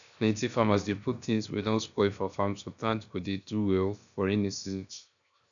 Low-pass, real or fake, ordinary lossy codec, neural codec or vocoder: 7.2 kHz; fake; none; codec, 16 kHz, 0.7 kbps, FocalCodec